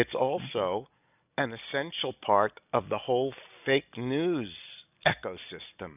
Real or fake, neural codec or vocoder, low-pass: real; none; 3.6 kHz